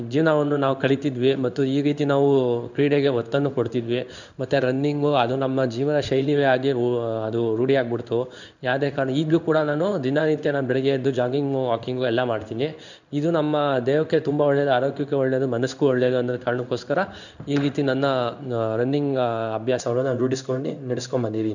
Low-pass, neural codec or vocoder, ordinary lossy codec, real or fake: 7.2 kHz; codec, 16 kHz in and 24 kHz out, 1 kbps, XY-Tokenizer; none; fake